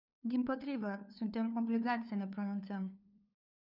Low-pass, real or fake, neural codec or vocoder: 5.4 kHz; fake; codec, 16 kHz, 2 kbps, FunCodec, trained on LibriTTS, 25 frames a second